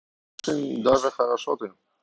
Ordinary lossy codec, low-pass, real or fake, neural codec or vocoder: none; none; real; none